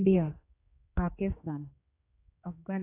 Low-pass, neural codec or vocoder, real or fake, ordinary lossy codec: 3.6 kHz; codec, 16 kHz, 2 kbps, X-Codec, HuBERT features, trained on general audio; fake; AAC, 24 kbps